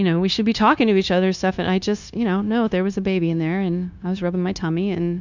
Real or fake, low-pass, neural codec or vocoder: fake; 7.2 kHz; codec, 16 kHz, 0.9 kbps, LongCat-Audio-Codec